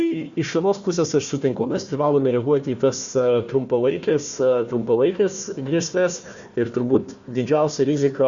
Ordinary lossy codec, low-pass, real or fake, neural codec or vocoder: Opus, 64 kbps; 7.2 kHz; fake; codec, 16 kHz, 1 kbps, FunCodec, trained on Chinese and English, 50 frames a second